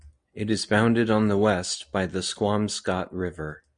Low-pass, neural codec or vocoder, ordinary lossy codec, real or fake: 9.9 kHz; none; Opus, 64 kbps; real